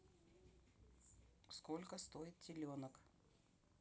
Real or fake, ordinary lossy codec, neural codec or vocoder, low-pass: real; none; none; none